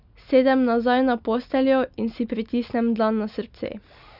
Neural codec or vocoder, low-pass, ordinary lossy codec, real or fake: none; 5.4 kHz; none; real